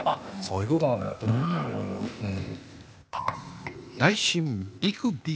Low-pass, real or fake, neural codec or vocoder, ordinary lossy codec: none; fake; codec, 16 kHz, 0.8 kbps, ZipCodec; none